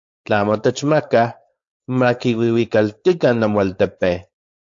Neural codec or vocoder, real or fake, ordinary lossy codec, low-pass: codec, 16 kHz, 4.8 kbps, FACodec; fake; AAC, 64 kbps; 7.2 kHz